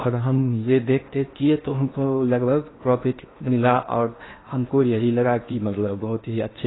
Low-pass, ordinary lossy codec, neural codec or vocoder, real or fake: 7.2 kHz; AAC, 16 kbps; codec, 16 kHz in and 24 kHz out, 0.8 kbps, FocalCodec, streaming, 65536 codes; fake